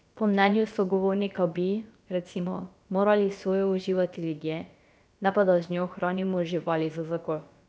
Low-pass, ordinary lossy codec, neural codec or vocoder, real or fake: none; none; codec, 16 kHz, about 1 kbps, DyCAST, with the encoder's durations; fake